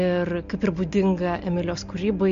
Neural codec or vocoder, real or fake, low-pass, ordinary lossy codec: none; real; 7.2 kHz; MP3, 48 kbps